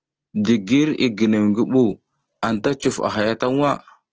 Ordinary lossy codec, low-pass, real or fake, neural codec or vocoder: Opus, 16 kbps; 7.2 kHz; real; none